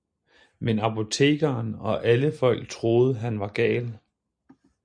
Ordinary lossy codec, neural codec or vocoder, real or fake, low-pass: MP3, 64 kbps; none; real; 9.9 kHz